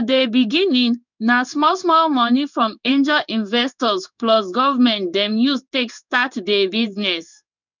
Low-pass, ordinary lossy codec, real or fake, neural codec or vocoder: 7.2 kHz; none; fake; codec, 16 kHz in and 24 kHz out, 1 kbps, XY-Tokenizer